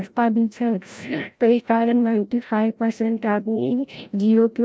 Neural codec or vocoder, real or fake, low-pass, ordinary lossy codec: codec, 16 kHz, 0.5 kbps, FreqCodec, larger model; fake; none; none